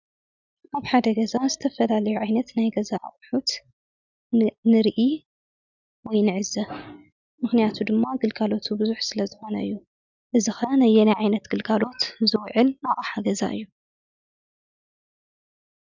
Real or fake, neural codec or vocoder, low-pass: real; none; 7.2 kHz